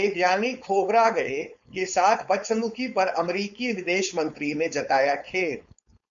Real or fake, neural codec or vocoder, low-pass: fake; codec, 16 kHz, 4.8 kbps, FACodec; 7.2 kHz